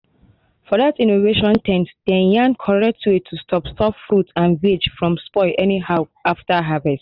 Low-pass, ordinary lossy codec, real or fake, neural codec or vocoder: 5.4 kHz; none; real; none